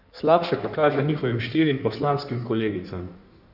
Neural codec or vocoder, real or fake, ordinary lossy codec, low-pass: codec, 16 kHz in and 24 kHz out, 1.1 kbps, FireRedTTS-2 codec; fake; none; 5.4 kHz